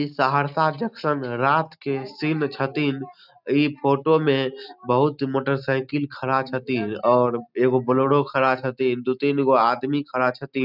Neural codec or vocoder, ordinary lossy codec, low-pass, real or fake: none; none; 5.4 kHz; real